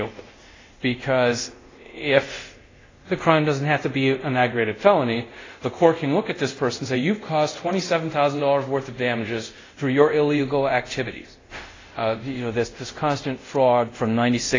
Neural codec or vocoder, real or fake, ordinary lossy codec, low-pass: codec, 24 kHz, 0.5 kbps, DualCodec; fake; AAC, 32 kbps; 7.2 kHz